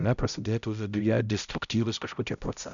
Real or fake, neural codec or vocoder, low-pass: fake; codec, 16 kHz, 0.5 kbps, X-Codec, HuBERT features, trained on balanced general audio; 7.2 kHz